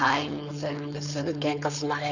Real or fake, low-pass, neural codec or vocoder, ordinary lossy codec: fake; 7.2 kHz; codec, 16 kHz, 4.8 kbps, FACodec; none